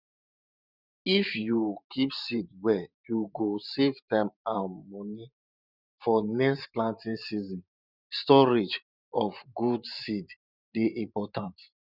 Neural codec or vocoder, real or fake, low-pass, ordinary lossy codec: vocoder, 44.1 kHz, 128 mel bands every 512 samples, BigVGAN v2; fake; 5.4 kHz; none